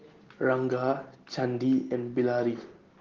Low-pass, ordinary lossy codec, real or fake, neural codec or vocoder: 7.2 kHz; Opus, 16 kbps; real; none